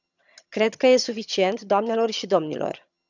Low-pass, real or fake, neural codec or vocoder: 7.2 kHz; fake; vocoder, 22.05 kHz, 80 mel bands, HiFi-GAN